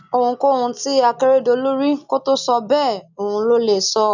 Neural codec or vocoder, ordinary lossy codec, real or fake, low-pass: none; none; real; 7.2 kHz